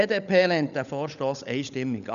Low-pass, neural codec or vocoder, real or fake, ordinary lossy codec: 7.2 kHz; codec, 16 kHz, 16 kbps, FunCodec, trained on LibriTTS, 50 frames a second; fake; none